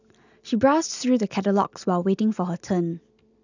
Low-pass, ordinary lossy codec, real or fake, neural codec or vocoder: 7.2 kHz; none; real; none